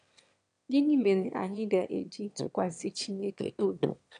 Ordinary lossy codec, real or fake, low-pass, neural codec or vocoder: none; fake; 9.9 kHz; autoencoder, 22.05 kHz, a latent of 192 numbers a frame, VITS, trained on one speaker